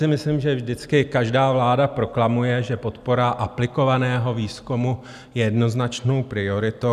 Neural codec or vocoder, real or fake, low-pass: none; real; 14.4 kHz